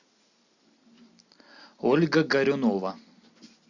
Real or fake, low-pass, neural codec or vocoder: real; 7.2 kHz; none